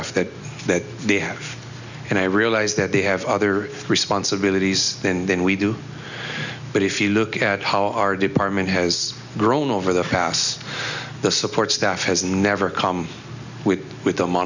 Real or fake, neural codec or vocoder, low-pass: real; none; 7.2 kHz